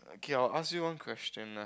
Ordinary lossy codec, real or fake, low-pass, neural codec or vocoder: none; real; none; none